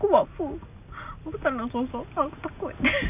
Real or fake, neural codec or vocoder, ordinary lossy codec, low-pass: real; none; none; 3.6 kHz